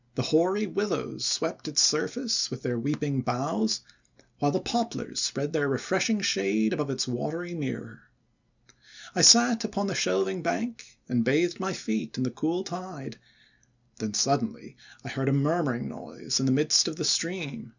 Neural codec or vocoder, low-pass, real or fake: none; 7.2 kHz; real